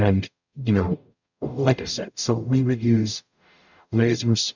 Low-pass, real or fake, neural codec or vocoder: 7.2 kHz; fake; codec, 44.1 kHz, 0.9 kbps, DAC